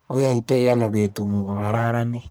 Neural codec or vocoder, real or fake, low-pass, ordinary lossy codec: codec, 44.1 kHz, 1.7 kbps, Pupu-Codec; fake; none; none